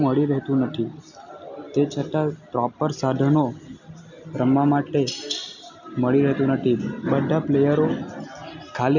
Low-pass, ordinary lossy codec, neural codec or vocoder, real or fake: 7.2 kHz; none; none; real